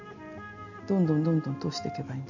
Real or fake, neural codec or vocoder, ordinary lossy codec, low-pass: real; none; none; 7.2 kHz